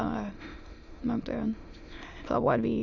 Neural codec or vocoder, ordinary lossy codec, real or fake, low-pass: autoencoder, 22.05 kHz, a latent of 192 numbers a frame, VITS, trained on many speakers; none; fake; 7.2 kHz